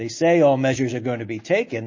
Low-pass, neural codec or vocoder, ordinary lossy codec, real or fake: 7.2 kHz; none; MP3, 32 kbps; real